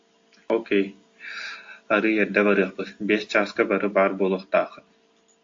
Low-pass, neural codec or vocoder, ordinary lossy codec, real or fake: 7.2 kHz; none; AAC, 48 kbps; real